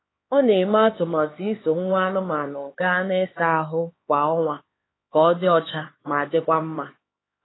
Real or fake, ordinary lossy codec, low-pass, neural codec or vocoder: fake; AAC, 16 kbps; 7.2 kHz; codec, 16 kHz, 4 kbps, X-Codec, HuBERT features, trained on LibriSpeech